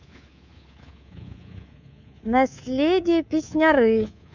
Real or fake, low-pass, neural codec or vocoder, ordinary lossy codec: fake; 7.2 kHz; codec, 24 kHz, 3.1 kbps, DualCodec; none